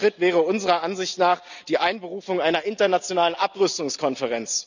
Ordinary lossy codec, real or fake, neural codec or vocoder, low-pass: none; real; none; 7.2 kHz